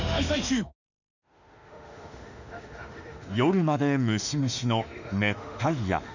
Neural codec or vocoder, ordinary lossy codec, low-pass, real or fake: autoencoder, 48 kHz, 32 numbers a frame, DAC-VAE, trained on Japanese speech; none; 7.2 kHz; fake